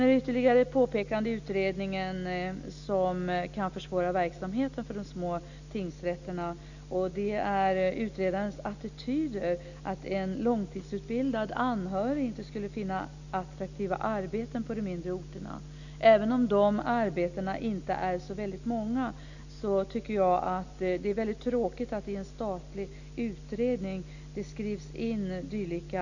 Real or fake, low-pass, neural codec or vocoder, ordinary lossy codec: real; 7.2 kHz; none; none